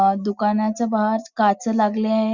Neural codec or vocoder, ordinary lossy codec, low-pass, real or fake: none; Opus, 64 kbps; 7.2 kHz; real